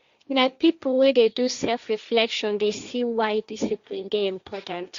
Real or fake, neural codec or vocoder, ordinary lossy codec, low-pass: fake; codec, 16 kHz, 1.1 kbps, Voila-Tokenizer; Opus, 64 kbps; 7.2 kHz